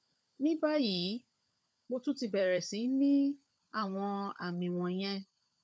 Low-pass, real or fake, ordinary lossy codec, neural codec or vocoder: none; fake; none; codec, 16 kHz, 16 kbps, FunCodec, trained on LibriTTS, 50 frames a second